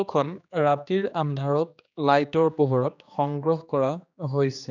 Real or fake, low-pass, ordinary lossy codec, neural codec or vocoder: fake; 7.2 kHz; none; codec, 16 kHz, 2 kbps, X-Codec, HuBERT features, trained on general audio